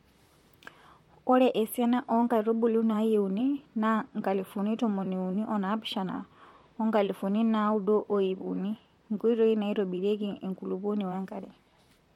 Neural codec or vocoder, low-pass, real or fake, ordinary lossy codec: vocoder, 44.1 kHz, 128 mel bands, Pupu-Vocoder; 19.8 kHz; fake; MP3, 64 kbps